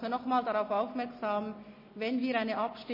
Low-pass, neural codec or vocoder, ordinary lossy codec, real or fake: 5.4 kHz; none; MP3, 24 kbps; real